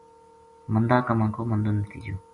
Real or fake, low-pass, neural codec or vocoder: real; 10.8 kHz; none